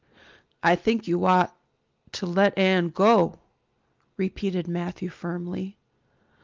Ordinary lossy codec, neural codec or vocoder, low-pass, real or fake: Opus, 24 kbps; none; 7.2 kHz; real